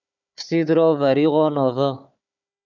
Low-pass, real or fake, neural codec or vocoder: 7.2 kHz; fake; codec, 16 kHz, 4 kbps, FunCodec, trained on Chinese and English, 50 frames a second